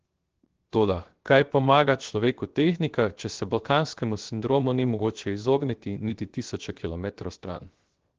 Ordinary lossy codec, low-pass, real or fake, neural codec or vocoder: Opus, 16 kbps; 7.2 kHz; fake; codec, 16 kHz, 0.7 kbps, FocalCodec